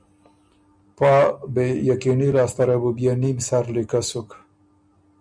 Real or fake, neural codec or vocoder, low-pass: real; none; 9.9 kHz